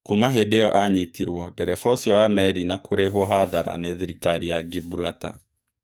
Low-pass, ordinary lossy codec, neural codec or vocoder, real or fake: none; none; codec, 44.1 kHz, 2.6 kbps, SNAC; fake